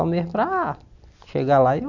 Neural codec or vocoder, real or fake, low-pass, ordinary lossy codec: none; real; 7.2 kHz; none